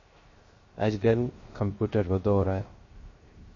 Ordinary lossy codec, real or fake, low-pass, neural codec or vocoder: MP3, 32 kbps; fake; 7.2 kHz; codec, 16 kHz, 0.3 kbps, FocalCodec